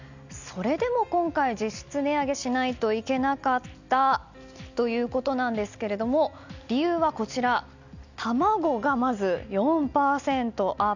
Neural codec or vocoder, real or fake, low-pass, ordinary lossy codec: none; real; 7.2 kHz; none